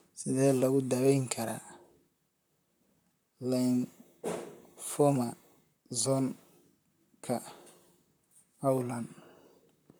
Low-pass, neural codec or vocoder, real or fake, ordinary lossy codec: none; vocoder, 44.1 kHz, 128 mel bands, Pupu-Vocoder; fake; none